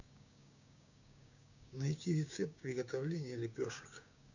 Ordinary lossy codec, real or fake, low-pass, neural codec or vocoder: none; fake; 7.2 kHz; codec, 16 kHz, 6 kbps, DAC